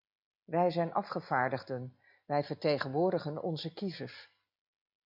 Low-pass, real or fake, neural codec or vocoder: 5.4 kHz; real; none